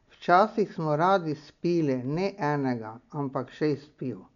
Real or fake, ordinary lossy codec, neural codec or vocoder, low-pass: real; none; none; 7.2 kHz